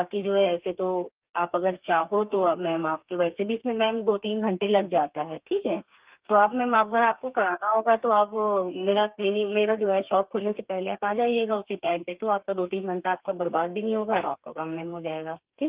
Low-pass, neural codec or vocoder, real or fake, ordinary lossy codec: 3.6 kHz; codec, 32 kHz, 1.9 kbps, SNAC; fake; Opus, 32 kbps